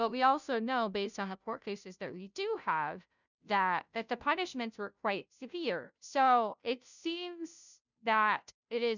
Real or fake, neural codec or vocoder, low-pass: fake; codec, 16 kHz, 0.5 kbps, FunCodec, trained on Chinese and English, 25 frames a second; 7.2 kHz